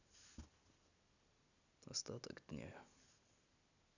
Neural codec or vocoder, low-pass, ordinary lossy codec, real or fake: none; 7.2 kHz; none; real